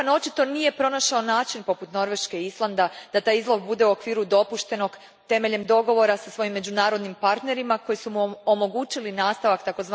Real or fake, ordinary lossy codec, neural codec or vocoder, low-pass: real; none; none; none